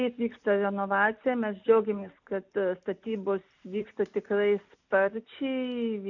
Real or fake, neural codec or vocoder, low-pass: real; none; 7.2 kHz